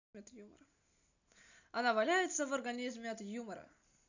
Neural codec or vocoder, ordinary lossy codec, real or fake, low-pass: none; none; real; 7.2 kHz